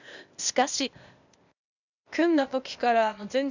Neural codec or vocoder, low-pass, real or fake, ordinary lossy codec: codec, 16 kHz, 0.8 kbps, ZipCodec; 7.2 kHz; fake; none